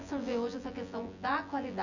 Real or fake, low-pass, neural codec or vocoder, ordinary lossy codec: fake; 7.2 kHz; vocoder, 24 kHz, 100 mel bands, Vocos; none